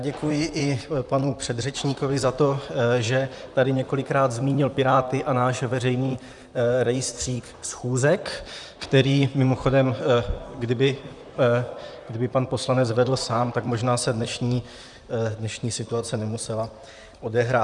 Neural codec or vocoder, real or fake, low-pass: vocoder, 44.1 kHz, 128 mel bands, Pupu-Vocoder; fake; 10.8 kHz